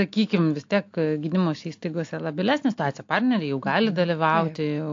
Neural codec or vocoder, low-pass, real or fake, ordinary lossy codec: none; 7.2 kHz; real; AAC, 48 kbps